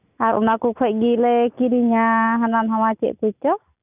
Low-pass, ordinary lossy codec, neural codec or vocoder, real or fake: 3.6 kHz; none; none; real